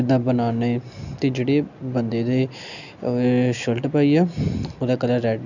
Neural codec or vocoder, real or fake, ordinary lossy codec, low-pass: none; real; none; 7.2 kHz